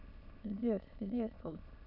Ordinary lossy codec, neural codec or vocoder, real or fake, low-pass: none; autoencoder, 22.05 kHz, a latent of 192 numbers a frame, VITS, trained on many speakers; fake; 5.4 kHz